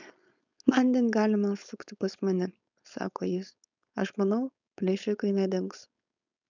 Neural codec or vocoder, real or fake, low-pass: codec, 16 kHz, 4.8 kbps, FACodec; fake; 7.2 kHz